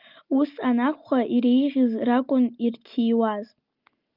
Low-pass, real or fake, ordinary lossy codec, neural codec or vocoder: 5.4 kHz; real; Opus, 24 kbps; none